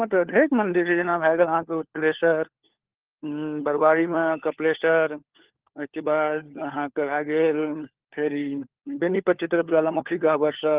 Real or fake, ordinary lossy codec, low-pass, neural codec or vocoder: fake; Opus, 16 kbps; 3.6 kHz; codec, 16 kHz, 4 kbps, FunCodec, trained on LibriTTS, 50 frames a second